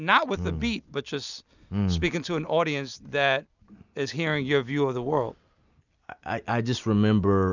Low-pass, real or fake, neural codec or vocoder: 7.2 kHz; real; none